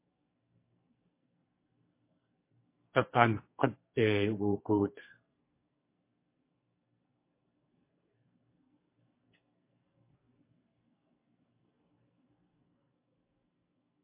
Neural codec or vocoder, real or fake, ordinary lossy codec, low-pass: codec, 44.1 kHz, 2.6 kbps, SNAC; fake; MP3, 24 kbps; 3.6 kHz